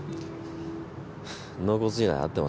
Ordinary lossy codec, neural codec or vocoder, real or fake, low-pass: none; none; real; none